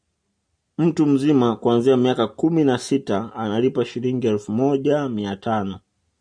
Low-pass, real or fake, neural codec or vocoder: 9.9 kHz; real; none